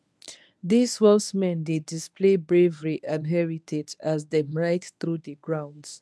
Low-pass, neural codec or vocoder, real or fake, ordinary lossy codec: none; codec, 24 kHz, 0.9 kbps, WavTokenizer, medium speech release version 1; fake; none